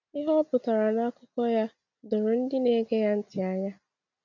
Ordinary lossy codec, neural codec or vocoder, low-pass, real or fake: none; none; 7.2 kHz; real